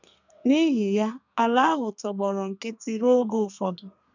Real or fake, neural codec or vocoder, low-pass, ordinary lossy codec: fake; codec, 32 kHz, 1.9 kbps, SNAC; 7.2 kHz; none